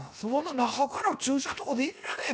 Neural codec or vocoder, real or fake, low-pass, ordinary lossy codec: codec, 16 kHz, 0.7 kbps, FocalCodec; fake; none; none